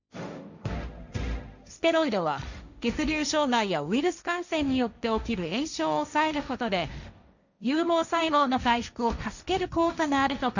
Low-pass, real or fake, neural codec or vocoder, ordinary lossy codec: 7.2 kHz; fake; codec, 16 kHz, 1.1 kbps, Voila-Tokenizer; none